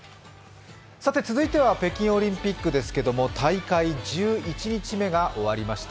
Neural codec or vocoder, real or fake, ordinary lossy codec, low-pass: none; real; none; none